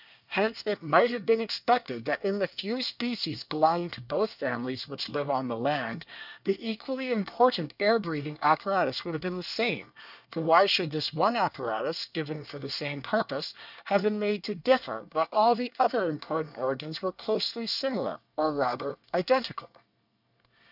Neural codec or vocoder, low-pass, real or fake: codec, 24 kHz, 1 kbps, SNAC; 5.4 kHz; fake